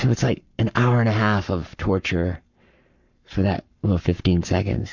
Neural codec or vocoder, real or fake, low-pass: codec, 44.1 kHz, 7.8 kbps, Pupu-Codec; fake; 7.2 kHz